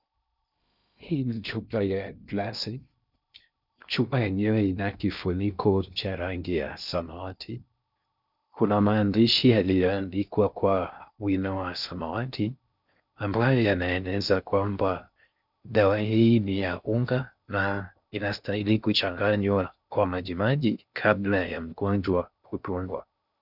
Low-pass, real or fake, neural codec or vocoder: 5.4 kHz; fake; codec, 16 kHz in and 24 kHz out, 0.6 kbps, FocalCodec, streaming, 2048 codes